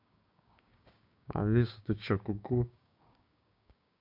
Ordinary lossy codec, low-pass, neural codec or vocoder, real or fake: none; 5.4 kHz; codec, 16 kHz, 6 kbps, DAC; fake